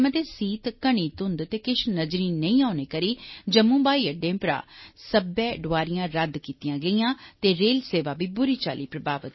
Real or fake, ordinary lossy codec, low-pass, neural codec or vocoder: real; MP3, 24 kbps; 7.2 kHz; none